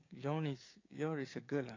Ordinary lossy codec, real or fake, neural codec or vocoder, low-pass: AAC, 32 kbps; fake; codec, 16 kHz, 6 kbps, DAC; 7.2 kHz